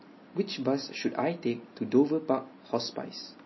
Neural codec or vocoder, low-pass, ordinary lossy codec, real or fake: none; 7.2 kHz; MP3, 24 kbps; real